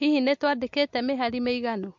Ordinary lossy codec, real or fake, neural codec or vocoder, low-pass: MP3, 48 kbps; real; none; 7.2 kHz